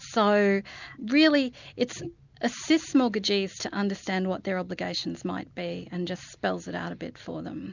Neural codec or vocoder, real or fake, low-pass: none; real; 7.2 kHz